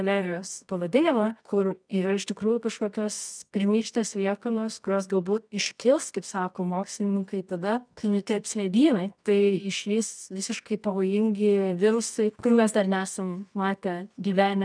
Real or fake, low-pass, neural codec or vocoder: fake; 9.9 kHz; codec, 24 kHz, 0.9 kbps, WavTokenizer, medium music audio release